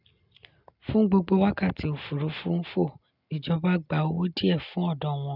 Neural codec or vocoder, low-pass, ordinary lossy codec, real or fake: none; 5.4 kHz; none; real